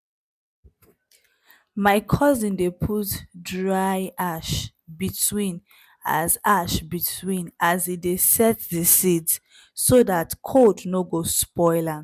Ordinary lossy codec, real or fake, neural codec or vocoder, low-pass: none; real; none; 14.4 kHz